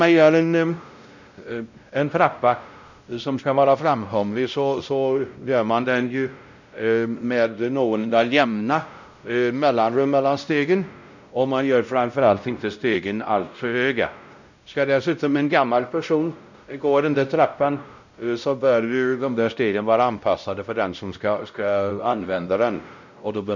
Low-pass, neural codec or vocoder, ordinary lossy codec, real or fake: 7.2 kHz; codec, 16 kHz, 0.5 kbps, X-Codec, WavLM features, trained on Multilingual LibriSpeech; none; fake